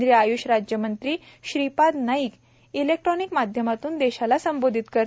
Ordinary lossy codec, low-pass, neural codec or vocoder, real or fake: none; none; none; real